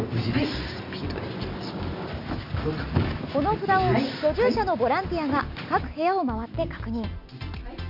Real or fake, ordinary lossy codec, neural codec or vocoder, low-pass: real; none; none; 5.4 kHz